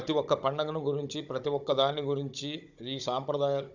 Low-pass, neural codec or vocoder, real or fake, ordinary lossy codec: 7.2 kHz; codec, 16 kHz, 16 kbps, FunCodec, trained on Chinese and English, 50 frames a second; fake; none